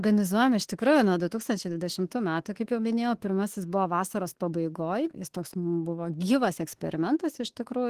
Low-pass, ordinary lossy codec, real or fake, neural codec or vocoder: 14.4 kHz; Opus, 16 kbps; fake; autoencoder, 48 kHz, 32 numbers a frame, DAC-VAE, trained on Japanese speech